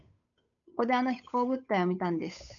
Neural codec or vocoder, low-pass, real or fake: codec, 16 kHz, 8 kbps, FunCodec, trained on Chinese and English, 25 frames a second; 7.2 kHz; fake